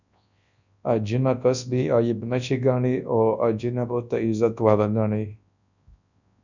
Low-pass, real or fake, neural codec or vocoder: 7.2 kHz; fake; codec, 24 kHz, 0.9 kbps, WavTokenizer, large speech release